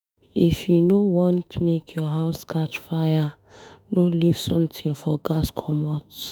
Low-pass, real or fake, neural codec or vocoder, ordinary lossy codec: none; fake; autoencoder, 48 kHz, 32 numbers a frame, DAC-VAE, trained on Japanese speech; none